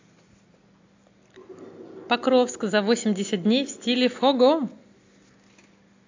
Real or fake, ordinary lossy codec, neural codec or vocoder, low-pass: real; AAC, 48 kbps; none; 7.2 kHz